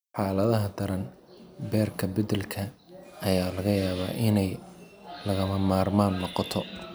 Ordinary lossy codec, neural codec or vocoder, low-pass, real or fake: none; none; none; real